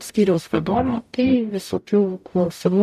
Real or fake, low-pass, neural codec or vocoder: fake; 14.4 kHz; codec, 44.1 kHz, 0.9 kbps, DAC